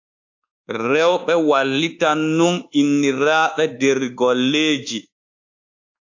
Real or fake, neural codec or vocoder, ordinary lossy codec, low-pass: fake; codec, 24 kHz, 1.2 kbps, DualCodec; AAC, 48 kbps; 7.2 kHz